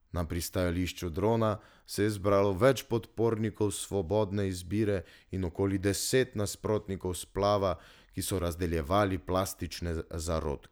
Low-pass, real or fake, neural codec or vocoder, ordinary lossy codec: none; real; none; none